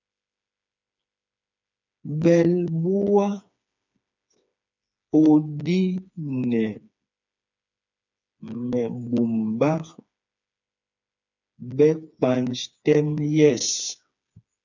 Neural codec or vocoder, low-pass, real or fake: codec, 16 kHz, 4 kbps, FreqCodec, smaller model; 7.2 kHz; fake